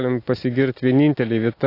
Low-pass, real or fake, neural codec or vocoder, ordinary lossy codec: 5.4 kHz; real; none; AAC, 32 kbps